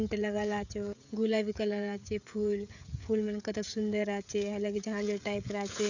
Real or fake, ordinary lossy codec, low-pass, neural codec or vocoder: fake; none; 7.2 kHz; codec, 16 kHz, 16 kbps, FreqCodec, smaller model